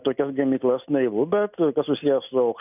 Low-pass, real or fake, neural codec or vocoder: 3.6 kHz; real; none